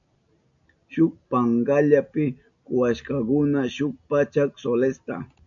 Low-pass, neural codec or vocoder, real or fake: 7.2 kHz; none; real